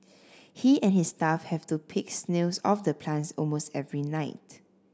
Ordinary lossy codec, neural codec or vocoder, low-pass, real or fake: none; none; none; real